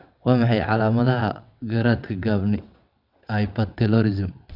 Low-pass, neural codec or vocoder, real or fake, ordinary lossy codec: 5.4 kHz; vocoder, 22.05 kHz, 80 mel bands, WaveNeXt; fake; none